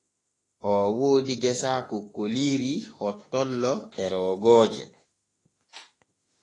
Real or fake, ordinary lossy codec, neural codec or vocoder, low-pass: fake; AAC, 32 kbps; autoencoder, 48 kHz, 32 numbers a frame, DAC-VAE, trained on Japanese speech; 10.8 kHz